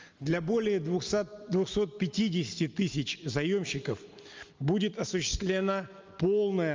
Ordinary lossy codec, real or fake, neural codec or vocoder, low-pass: Opus, 24 kbps; real; none; 7.2 kHz